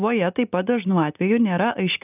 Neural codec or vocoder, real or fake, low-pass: none; real; 3.6 kHz